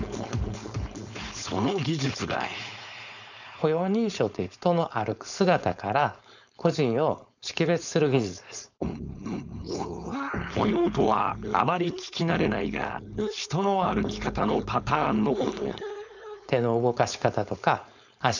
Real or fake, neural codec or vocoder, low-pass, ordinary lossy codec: fake; codec, 16 kHz, 4.8 kbps, FACodec; 7.2 kHz; none